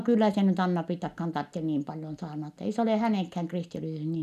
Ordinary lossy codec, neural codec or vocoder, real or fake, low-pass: none; none; real; 14.4 kHz